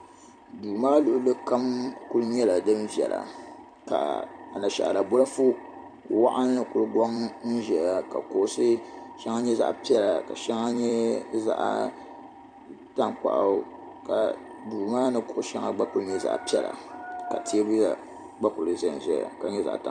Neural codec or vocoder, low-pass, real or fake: vocoder, 44.1 kHz, 128 mel bands every 256 samples, BigVGAN v2; 9.9 kHz; fake